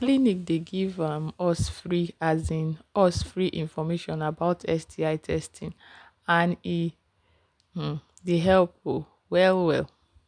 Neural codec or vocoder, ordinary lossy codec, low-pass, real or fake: none; none; 9.9 kHz; real